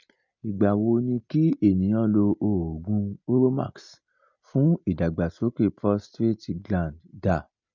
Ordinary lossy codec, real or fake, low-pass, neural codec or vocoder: none; real; 7.2 kHz; none